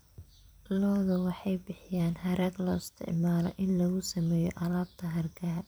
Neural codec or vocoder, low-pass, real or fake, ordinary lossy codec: vocoder, 44.1 kHz, 128 mel bands every 256 samples, BigVGAN v2; none; fake; none